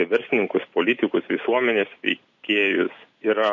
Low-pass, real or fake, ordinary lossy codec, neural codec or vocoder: 7.2 kHz; real; MP3, 48 kbps; none